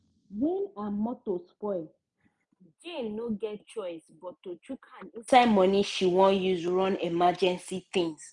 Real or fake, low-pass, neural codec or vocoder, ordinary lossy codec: real; 10.8 kHz; none; none